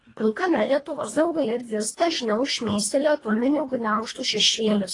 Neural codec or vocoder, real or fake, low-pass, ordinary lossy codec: codec, 24 kHz, 1.5 kbps, HILCodec; fake; 10.8 kHz; AAC, 32 kbps